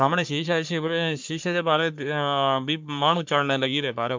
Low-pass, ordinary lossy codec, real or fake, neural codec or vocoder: 7.2 kHz; MP3, 64 kbps; fake; codec, 44.1 kHz, 3.4 kbps, Pupu-Codec